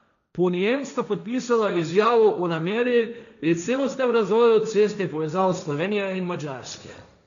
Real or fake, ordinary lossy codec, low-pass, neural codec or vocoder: fake; none; 7.2 kHz; codec, 16 kHz, 1.1 kbps, Voila-Tokenizer